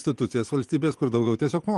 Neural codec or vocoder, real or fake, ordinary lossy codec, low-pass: vocoder, 24 kHz, 100 mel bands, Vocos; fake; Opus, 24 kbps; 10.8 kHz